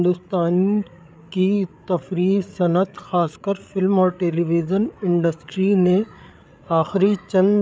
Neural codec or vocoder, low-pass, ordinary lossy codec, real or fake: codec, 16 kHz, 8 kbps, FreqCodec, larger model; none; none; fake